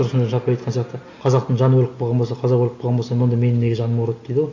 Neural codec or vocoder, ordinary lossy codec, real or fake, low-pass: none; AAC, 48 kbps; real; 7.2 kHz